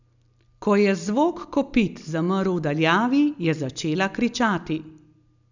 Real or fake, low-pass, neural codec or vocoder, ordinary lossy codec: real; 7.2 kHz; none; none